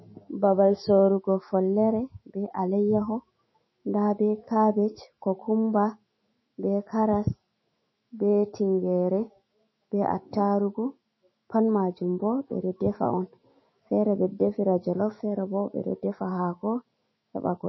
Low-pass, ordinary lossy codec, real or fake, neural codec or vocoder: 7.2 kHz; MP3, 24 kbps; fake; autoencoder, 48 kHz, 128 numbers a frame, DAC-VAE, trained on Japanese speech